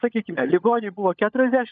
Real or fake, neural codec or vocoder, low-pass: fake; codec, 16 kHz, 16 kbps, FunCodec, trained on LibriTTS, 50 frames a second; 7.2 kHz